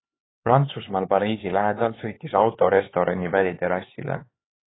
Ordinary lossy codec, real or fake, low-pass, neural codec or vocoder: AAC, 16 kbps; fake; 7.2 kHz; codec, 16 kHz, 4 kbps, X-Codec, HuBERT features, trained on LibriSpeech